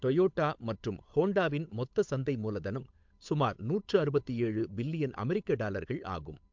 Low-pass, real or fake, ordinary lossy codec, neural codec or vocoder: 7.2 kHz; fake; MP3, 64 kbps; codec, 16 kHz, 8 kbps, FunCodec, trained on Chinese and English, 25 frames a second